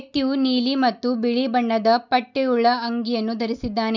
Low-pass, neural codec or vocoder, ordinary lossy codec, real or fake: 7.2 kHz; none; none; real